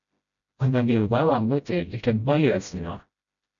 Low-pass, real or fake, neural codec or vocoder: 7.2 kHz; fake; codec, 16 kHz, 0.5 kbps, FreqCodec, smaller model